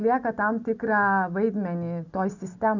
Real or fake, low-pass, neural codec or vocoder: real; 7.2 kHz; none